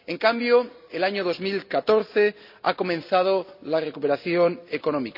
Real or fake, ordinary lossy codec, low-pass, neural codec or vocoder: real; none; 5.4 kHz; none